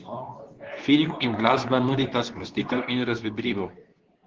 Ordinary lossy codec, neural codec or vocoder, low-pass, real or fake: Opus, 16 kbps; codec, 24 kHz, 0.9 kbps, WavTokenizer, medium speech release version 2; 7.2 kHz; fake